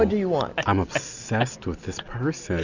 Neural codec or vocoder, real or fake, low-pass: none; real; 7.2 kHz